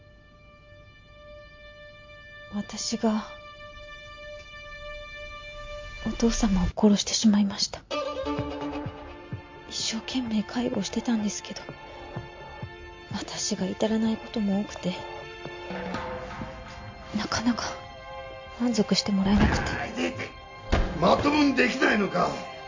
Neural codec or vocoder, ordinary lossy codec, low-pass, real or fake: none; MP3, 48 kbps; 7.2 kHz; real